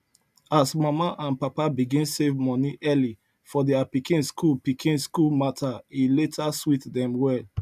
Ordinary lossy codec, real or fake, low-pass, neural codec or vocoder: none; real; 14.4 kHz; none